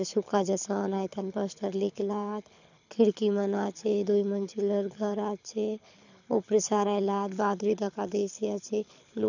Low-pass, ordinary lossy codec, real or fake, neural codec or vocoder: 7.2 kHz; none; fake; codec, 24 kHz, 6 kbps, HILCodec